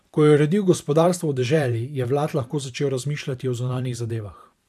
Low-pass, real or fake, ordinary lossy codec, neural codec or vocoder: 14.4 kHz; fake; AAC, 96 kbps; vocoder, 44.1 kHz, 128 mel bands every 512 samples, BigVGAN v2